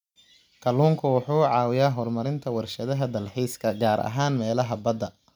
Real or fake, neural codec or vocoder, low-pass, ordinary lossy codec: real; none; 19.8 kHz; none